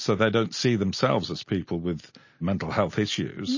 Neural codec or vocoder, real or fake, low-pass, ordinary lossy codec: none; real; 7.2 kHz; MP3, 32 kbps